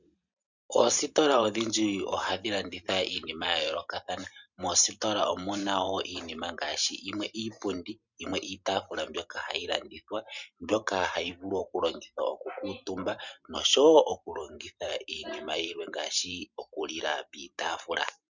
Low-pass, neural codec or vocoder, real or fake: 7.2 kHz; vocoder, 44.1 kHz, 128 mel bands every 512 samples, BigVGAN v2; fake